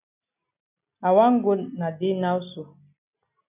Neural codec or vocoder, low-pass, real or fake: none; 3.6 kHz; real